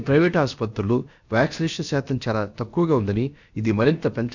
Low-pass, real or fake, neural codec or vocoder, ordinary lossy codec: 7.2 kHz; fake; codec, 16 kHz, about 1 kbps, DyCAST, with the encoder's durations; none